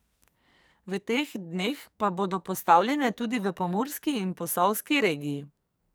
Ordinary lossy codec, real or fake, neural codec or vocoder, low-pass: none; fake; codec, 44.1 kHz, 2.6 kbps, SNAC; none